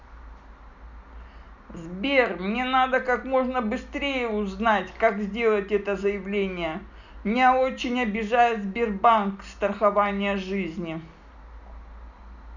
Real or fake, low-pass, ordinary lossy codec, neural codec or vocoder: real; 7.2 kHz; none; none